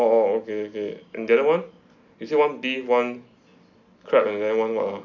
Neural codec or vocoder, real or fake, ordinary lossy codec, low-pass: none; real; none; 7.2 kHz